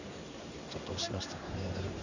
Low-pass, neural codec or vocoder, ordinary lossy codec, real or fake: 7.2 kHz; codec, 16 kHz in and 24 kHz out, 1 kbps, XY-Tokenizer; none; fake